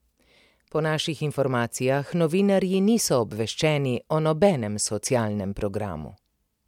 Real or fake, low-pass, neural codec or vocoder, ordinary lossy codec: real; 19.8 kHz; none; MP3, 96 kbps